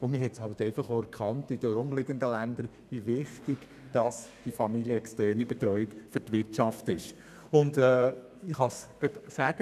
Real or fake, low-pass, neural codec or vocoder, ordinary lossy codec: fake; 14.4 kHz; codec, 32 kHz, 1.9 kbps, SNAC; none